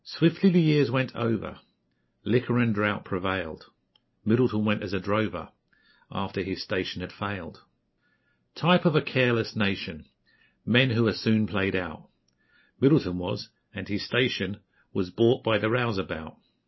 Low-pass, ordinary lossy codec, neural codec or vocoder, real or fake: 7.2 kHz; MP3, 24 kbps; none; real